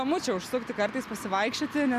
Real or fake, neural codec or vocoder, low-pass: real; none; 14.4 kHz